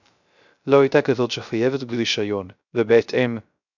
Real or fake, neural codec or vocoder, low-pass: fake; codec, 16 kHz, 0.3 kbps, FocalCodec; 7.2 kHz